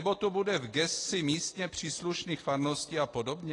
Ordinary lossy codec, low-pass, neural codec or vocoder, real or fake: AAC, 32 kbps; 10.8 kHz; none; real